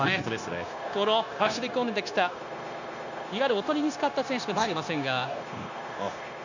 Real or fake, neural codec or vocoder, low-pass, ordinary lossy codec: fake; codec, 16 kHz, 0.9 kbps, LongCat-Audio-Codec; 7.2 kHz; none